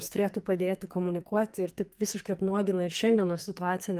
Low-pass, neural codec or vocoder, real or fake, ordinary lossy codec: 14.4 kHz; codec, 44.1 kHz, 2.6 kbps, SNAC; fake; Opus, 32 kbps